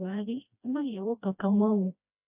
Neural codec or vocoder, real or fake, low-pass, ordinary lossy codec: codec, 16 kHz, 1 kbps, FreqCodec, smaller model; fake; 3.6 kHz; none